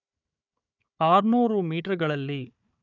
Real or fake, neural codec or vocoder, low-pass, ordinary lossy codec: fake; codec, 16 kHz, 4 kbps, FunCodec, trained on Chinese and English, 50 frames a second; 7.2 kHz; none